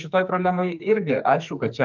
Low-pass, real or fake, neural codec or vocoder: 7.2 kHz; fake; codec, 44.1 kHz, 2.6 kbps, SNAC